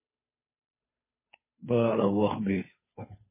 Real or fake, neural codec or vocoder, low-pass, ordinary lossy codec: fake; codec, 16 kHz, 2 kbps, FunCodec, trained on Chinese and English, 25 frames a second; 3.6 kHz; MP3, 16 kbps